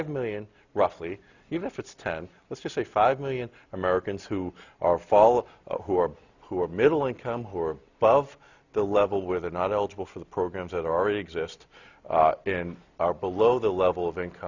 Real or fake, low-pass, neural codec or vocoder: real; 7.2 kHz; none